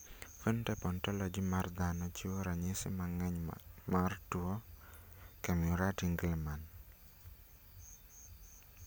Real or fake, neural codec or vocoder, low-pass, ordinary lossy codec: real; none; none; none